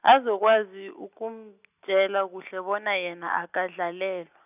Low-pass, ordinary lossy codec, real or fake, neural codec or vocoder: 3.6 kHz; none; real; none